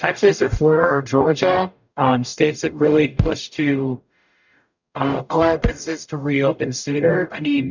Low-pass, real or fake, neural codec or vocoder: 7.2 kHz; fake; codec, 44.1 kHz, 0.9 kbps, DAC